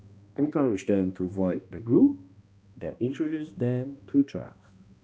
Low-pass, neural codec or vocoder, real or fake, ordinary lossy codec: none; codec, 16 kHz, 1 kbps, X-Codec, HuBERT features, trained on balanced general audio; fake; none